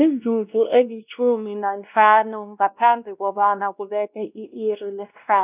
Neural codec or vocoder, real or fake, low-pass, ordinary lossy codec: codec, 16 kHz, 1 kbps, X-Codec, WavLM features, trained on Multilingual LibriSpeech; fake; 3.6 kHz; none